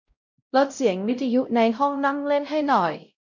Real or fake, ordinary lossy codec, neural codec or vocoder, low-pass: fake; none; codec, 16 kHz, 0.5 kbps, X-Codec, WavLM features, trained on Multilingual LibriSpeech; 7.2 kHz